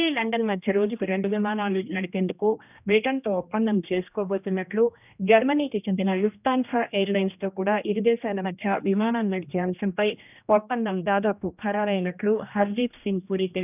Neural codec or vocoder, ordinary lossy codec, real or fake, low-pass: codec, 16 kHz, 1 kbps, X-Codec, HuBERT features, trained on general audio; none; fake; 3.6 kHz